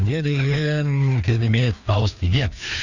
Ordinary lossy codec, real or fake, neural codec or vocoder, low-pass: none; fake; codec, 16 kHz, 2 kbps, FreqCodec, larger model; 7.2 kHz